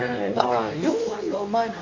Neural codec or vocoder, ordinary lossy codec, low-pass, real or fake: codec, 24 kHz, 0.9 kbps, WavTokenizer, medium speech release version 2; MP3, 48 kbps; 7.2 kHz; fake